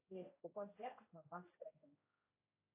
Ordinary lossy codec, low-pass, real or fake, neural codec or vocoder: AAC, 16 kbps; 3.6 kHz; fake; codec, 16 kHz, 0.5 kbps, X-Codec, HuBERT features, trained on general audio